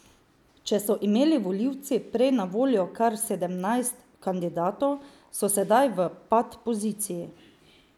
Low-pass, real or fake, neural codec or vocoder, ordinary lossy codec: 19.8 kHz; real; none; none